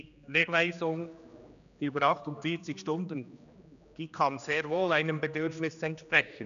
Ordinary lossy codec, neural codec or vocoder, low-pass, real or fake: none; codec, 16 kHz, 2 kbps, X-Codec, HuBERT features, trained on general audio; 7.2 kHz; fake